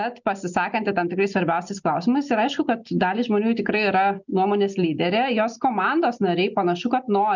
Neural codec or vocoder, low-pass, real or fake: none; 7.2 kHz; real